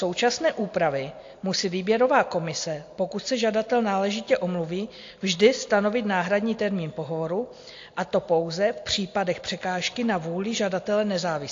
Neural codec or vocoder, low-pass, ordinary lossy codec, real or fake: none; 7.2 kHz; AAC, 48 kbps; real